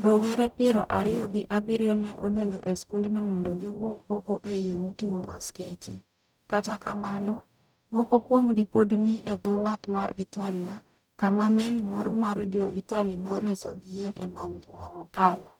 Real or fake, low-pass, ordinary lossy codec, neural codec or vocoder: fake; 19.8 kHz; none; codec, 44.1 kHz, 0.9 kbps, DAC